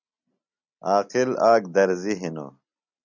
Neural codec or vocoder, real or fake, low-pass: none; real; 7.2 kHz